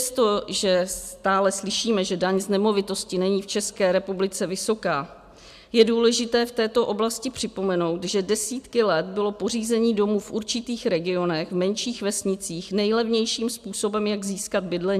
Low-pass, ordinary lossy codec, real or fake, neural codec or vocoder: 14.4 kHz; AAC, 96 kbps; real; none